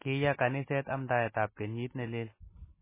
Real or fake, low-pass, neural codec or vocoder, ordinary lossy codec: real; 3.6 kHz; none; MP3, 16 kbps